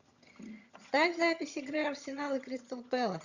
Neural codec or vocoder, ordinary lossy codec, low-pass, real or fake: vocoder, 22.05 kHz, 80 mel bands, HiFi-GAN; Opus, 64 kbps; 7.2 kHz; fake